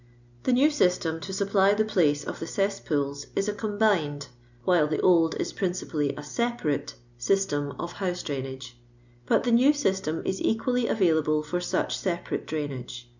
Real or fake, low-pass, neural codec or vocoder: real; 7.2 kHz; none